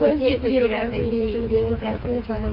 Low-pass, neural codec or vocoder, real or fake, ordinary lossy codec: 5.4 kHz; codec, 16 kHz, 4 kbps, FreqCodec, smaller model; fake; AAC, 32 kbps